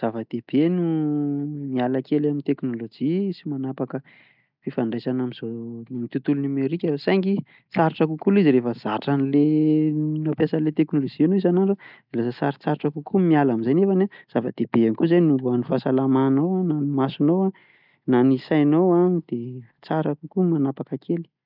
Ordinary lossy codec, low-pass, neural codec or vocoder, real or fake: none; 5.4 kHz; none; real